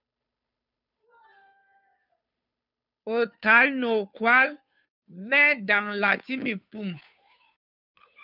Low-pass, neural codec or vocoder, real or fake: 5.4 kHz; codec, 16 kHz, 2 kbps, FunCodec, trained on Chinese and English, 25 frames a second; fake